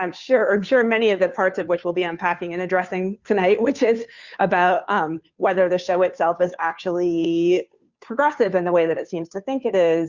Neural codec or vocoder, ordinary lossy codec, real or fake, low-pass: codec, 16 kHz, 2 kbps, FunCodec, trained on Chinese and English, 25 frames a second; Opus, 64 kbps; fake; 7.2 kHz